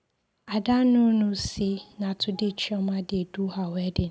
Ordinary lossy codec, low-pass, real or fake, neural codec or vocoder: none; none; real; none